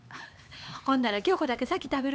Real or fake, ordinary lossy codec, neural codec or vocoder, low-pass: fake; none; codec, 16 kHz, 4 kbps, X-Codec, HuBERT features, trained on LibriSpeech; none